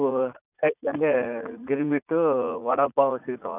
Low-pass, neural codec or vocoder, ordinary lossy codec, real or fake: 3.6 kHz; vocoder, 44.1 kHz, 80 mel bands, Vocos; none; fake